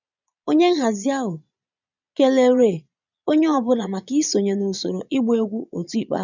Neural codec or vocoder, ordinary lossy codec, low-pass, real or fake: vocoder, 22.05 kHz, 80 mel bands, Vocos; none; 7.2 kHz; fake